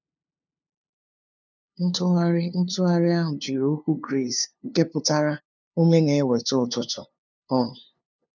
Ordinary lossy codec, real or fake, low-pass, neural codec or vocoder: none; fake; 7.2 kHz; codec, 16 kHz, 2 kbps, FunCodec, trained on LibriTTS, 25 frames a second